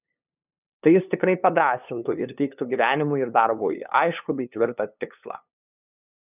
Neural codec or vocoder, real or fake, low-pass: codec, 16 kHz, 2 kbps, FunCodec, trained on LibriTTS, 25 frames a second; fake; 3.6 kHz